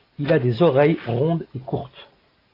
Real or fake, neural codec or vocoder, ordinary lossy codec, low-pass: real; none; AAC, 24 kbps; 5.4 kHz